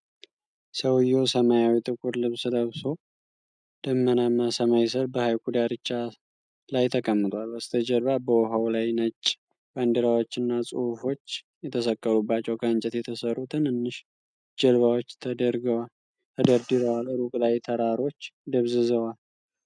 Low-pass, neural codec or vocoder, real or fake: 9.9 kHz; none; real